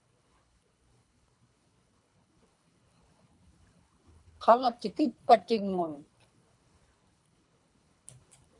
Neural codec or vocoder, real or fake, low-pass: codec, 24 kHz, 3 kbps, HILCodec; fake; 10.8 kHz